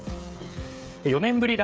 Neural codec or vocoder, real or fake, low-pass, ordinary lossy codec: codec, 16 kHz, 16 kbps, FreqCodec, smaller model; fake; none; none